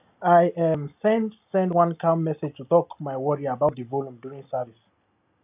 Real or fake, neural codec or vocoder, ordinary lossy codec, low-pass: real; none; AAC, 32 kbps; 3.6 kHz